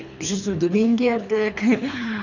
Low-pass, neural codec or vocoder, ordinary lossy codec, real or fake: 7.2 kHz; codec, 24 kHz, 3 kbps, HILCodec; none; fake